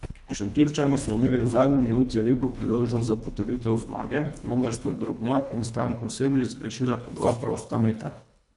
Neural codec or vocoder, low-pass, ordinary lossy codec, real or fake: codec, 24 kHz, 1.5 kbps, HILCodec; 10.8 kHz; none; fake